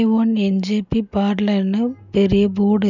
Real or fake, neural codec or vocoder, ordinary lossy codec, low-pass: real; none; none; 7.2 kHz